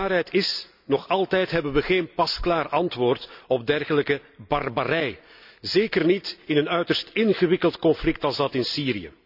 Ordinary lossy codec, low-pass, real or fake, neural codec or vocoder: none; 5.4 kHz; real; none